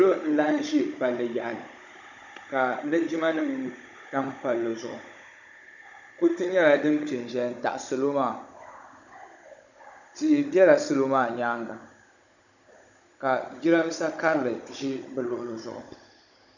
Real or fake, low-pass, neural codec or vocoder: fake; 7.2 kHz; codec, 16 kHz, 16 kbps, FunCodec, trained on Chinese and English, 50 frames a second